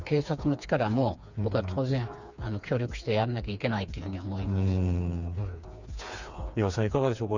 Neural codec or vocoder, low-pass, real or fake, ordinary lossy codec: codec, 16 kHz, 4 kbps, FreqCodec, smaller model; 7.2 kHz; fake; Opus, 64 kbps